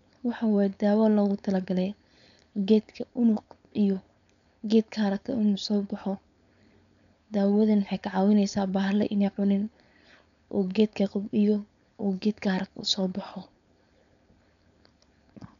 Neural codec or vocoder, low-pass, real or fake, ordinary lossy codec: codec, 16 kHz, 4.8 kbps, FACodec; 7.2 kHz; fake; none